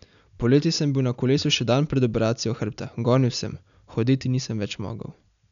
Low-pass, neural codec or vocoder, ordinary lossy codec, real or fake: 7.2 kHz; none; none; real